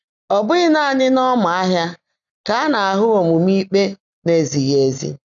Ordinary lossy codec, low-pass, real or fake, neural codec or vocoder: none; 7.2 kHz; real; none